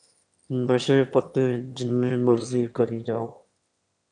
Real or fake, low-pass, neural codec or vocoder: fake; 9.9 kHz; autoencoder, 22.05 kHz, a latent of 192 numbers a frame, VITS, trained on one speaker